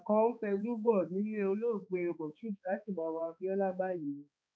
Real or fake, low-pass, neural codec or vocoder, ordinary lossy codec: fake; none; codec, 16 kHz, 2 kbps, X-Codec, HuBERT features, trained on balanced general audio; none